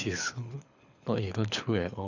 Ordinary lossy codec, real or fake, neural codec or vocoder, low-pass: none; fake; codec, 24 kHz, 6 kbps, HILCodec; 7.2 kHz